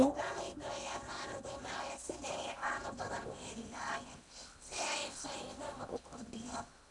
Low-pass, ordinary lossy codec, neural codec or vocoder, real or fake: 10.8 kHz; none; codec, 16 kHz in and 24 kHz out, 0.8 kbps, FocalCodec, streaming, 65536 codes; fake